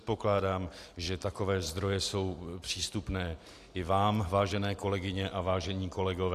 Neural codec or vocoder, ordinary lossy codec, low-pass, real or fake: none; AAC, 64 kbps; 14.4 kHz; real